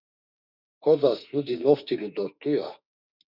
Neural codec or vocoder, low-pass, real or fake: autoencoder, 48 kHz, 32 numbers a frame, DAC-VAE, trained on Japanese speech; 5.4 kHz; fake